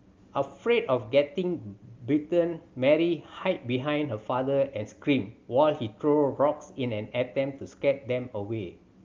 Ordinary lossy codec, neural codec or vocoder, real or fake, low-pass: Opus, 32 kbps; none; real; 7.2 kHz